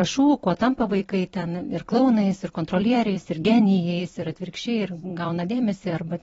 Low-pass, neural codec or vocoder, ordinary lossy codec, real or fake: 19.8 kHz; vocoder, 44.1 kHz, 128 mel bands every 256 samples, BigVGAN v2; AAC, 24 kbps; fake